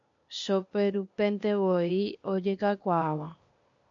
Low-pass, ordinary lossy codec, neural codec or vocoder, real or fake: 7.2 kHz; MP3, 48 kbps; codec, 16 kHz, 0.8 kbps, ZipCodec; fake